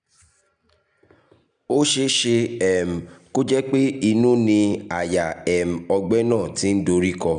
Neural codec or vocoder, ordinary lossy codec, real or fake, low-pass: none; none; real; 9.9 kHz